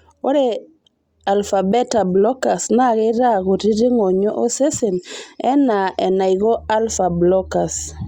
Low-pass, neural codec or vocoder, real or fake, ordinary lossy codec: 19.8 kHz; none; real; Opus, 64 kbps